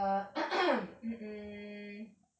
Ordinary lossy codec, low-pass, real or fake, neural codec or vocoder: none; none; real; none